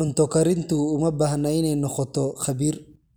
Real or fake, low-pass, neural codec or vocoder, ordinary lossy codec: real; none; none; none